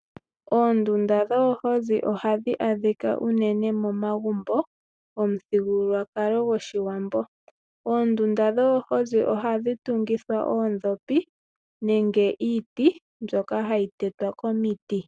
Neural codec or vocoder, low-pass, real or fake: none; 9.9 kHz; real